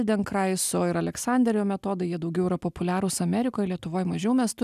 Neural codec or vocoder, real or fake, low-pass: none; real; 14.4 kHz